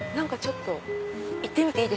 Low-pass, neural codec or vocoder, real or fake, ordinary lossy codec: none; none; real; none